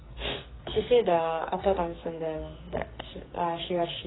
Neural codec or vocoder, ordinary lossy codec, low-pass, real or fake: codec, 44.1 kHz, 2.6 kbps, SNAC; AAC, 16 kbps; 7.2 kHz; fake